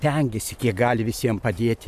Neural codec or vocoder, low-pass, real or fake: vocoder, 44.1 kHz, 128 mel bands every 512 samples, BigVGAN v2; 14.4 kHz; fake